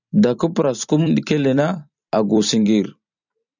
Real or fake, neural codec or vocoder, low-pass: fake; vocoder, 44.1 kHz, 128 mel bands every 256 samples, BigVGAN v2; 7.2 kHz